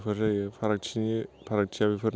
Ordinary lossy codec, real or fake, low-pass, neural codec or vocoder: none; real; none; none